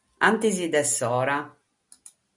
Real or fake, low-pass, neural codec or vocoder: real; 10.8 kHz; none